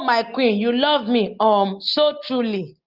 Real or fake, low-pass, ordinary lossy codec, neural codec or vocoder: real; 5.4 kHz; Opus, 32 kbps; none